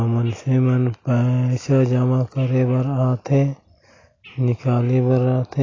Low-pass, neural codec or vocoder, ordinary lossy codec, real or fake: 7.2 kHz; none; AAC, 32 kbps; real